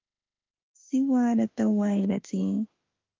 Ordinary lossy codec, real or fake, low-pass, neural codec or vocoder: Opus, 24 kbps; fake; 7.2 kHz; autoencoder, 48 kHz, 32 numbers a frame, DAC-VAE, trained on Japanese speech